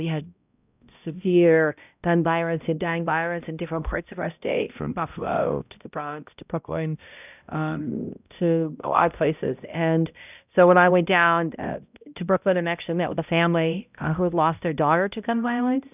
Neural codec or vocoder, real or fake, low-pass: codec, 16 kHz, 0.5 kbps, X-Codec, HuBERT features, trained on balanced general audio; fake; 3.6 kHz